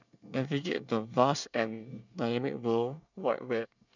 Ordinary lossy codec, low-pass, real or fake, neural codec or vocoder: none; 7.2 kHz; fake; codec, 24 kHz, 1 kbps, SNAC